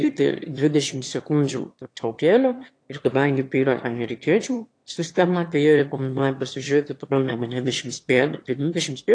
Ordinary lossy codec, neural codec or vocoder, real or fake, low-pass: AAC, 48 kbps; autoencoder, 22.05 kHz, a latent of 192 numbers a frame, VITS, trained on one speaker; fake; 9.9 kHz